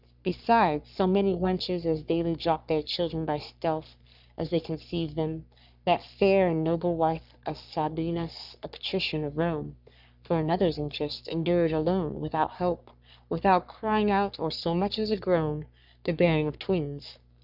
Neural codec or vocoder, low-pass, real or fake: codec, 44.1 kHz, 3.4 kbps, Pupu-Codec; 5.4 kHz; fake